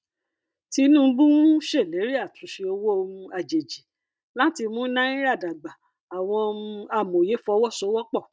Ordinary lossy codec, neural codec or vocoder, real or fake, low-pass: none; none; real; none